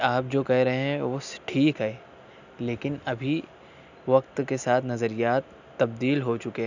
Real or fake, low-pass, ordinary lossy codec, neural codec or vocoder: real; 7.2 kHz; none; none